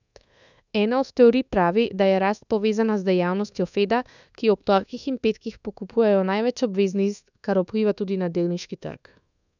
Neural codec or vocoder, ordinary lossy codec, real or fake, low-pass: codec, 24 kHz, 1.2 kbps, DualCodec; none; fake; 7.2 kHz